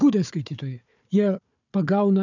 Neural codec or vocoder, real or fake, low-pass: codec, 16 kHz, 4 kbps, FunCodec, trained on Chinese and English, 50 frames a second; fake; 7.2 kHz